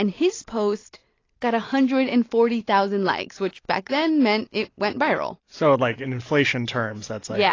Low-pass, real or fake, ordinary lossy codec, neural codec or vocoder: 7.2 kHz; real; AAC, 32 kbps; none